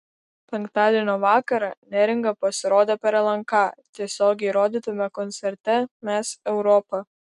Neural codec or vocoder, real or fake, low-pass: none; real; 10.8 kHz